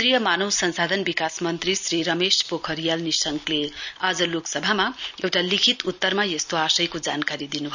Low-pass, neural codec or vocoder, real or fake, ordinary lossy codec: 7.2 kHz; none; real; none